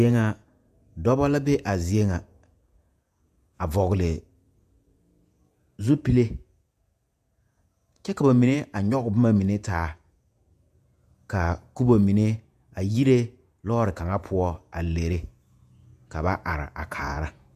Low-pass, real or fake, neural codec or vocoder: 14.4 kHz; fake; vocoder, 48 kHz, 128 mel bands, Vocos